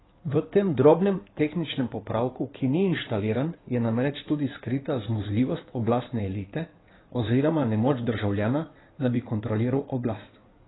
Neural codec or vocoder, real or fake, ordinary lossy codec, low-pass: codec, 16 kHz in and 24 kHz out, 2.2 kbps, FireRedTTS-2 codec; fake; AAC, 16 kbps; 7.2 kHz